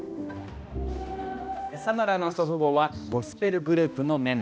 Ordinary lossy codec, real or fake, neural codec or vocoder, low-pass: none; fake; codec, 16 kHz, 1 kbps, X-Codec, HuBERT features, trained on balanced general audio; none